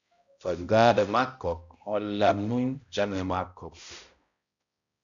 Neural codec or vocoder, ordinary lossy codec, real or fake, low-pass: codec, 16 kHz, 0.5 kbps, X-Codec, HuBERT features, trained on balanced general audio; MP3, 96 kbps; fake; 7.2 kHz